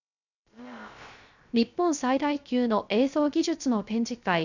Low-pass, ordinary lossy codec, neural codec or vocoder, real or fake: 7.2 kHz; none; codec, 16 kHz, 0.3 kbps, FocalCodec; fake